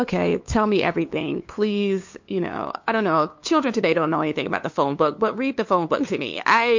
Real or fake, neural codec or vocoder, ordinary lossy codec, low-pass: fake; codec, 16 kHz, 2 kbps, FunCodec, trained on LibriTTS, 25 frames a second; MP3, 48 kbps; 7.2 kHz